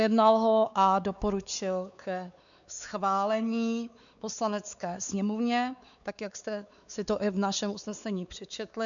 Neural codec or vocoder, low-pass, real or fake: codec, 16 kHz, 4 kbps, X-Codec, WavLM features, trained on Multilingual LibriSpeech; 7.2 kHz; fake